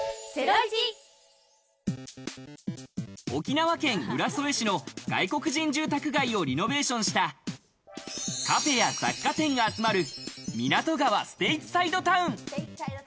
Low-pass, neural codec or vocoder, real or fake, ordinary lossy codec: none; none; real; none